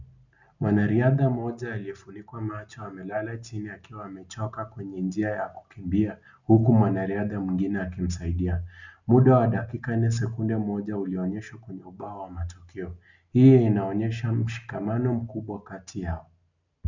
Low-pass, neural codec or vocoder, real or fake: 7.2 kHz; none; real